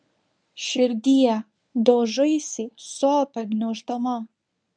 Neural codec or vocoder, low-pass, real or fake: codec, 24 kHz, 0.9 kbps, WavTokenizer, medium speech release version 1; 9.9 kHz; fake